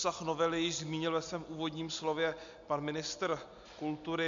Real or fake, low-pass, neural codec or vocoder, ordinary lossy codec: real; 7.2 kHz; none; MP3, 64 kbps